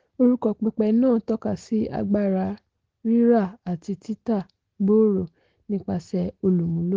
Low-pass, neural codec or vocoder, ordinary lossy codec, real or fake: 7.2 kHz; none; Opus, 16 kbps; real